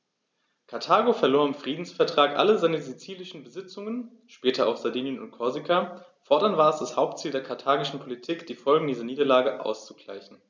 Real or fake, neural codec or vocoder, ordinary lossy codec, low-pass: real; none; none; none